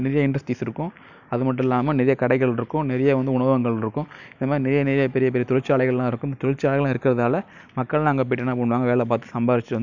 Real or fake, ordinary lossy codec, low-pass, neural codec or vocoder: real; none; 7.2 kHz; none